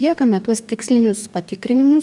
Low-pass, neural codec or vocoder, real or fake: 10.8 kHz; codec, 44.1 kHz, 2.6 kbps, DAC; fake